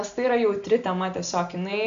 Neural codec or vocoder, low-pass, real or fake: none; 7.2 kHz; real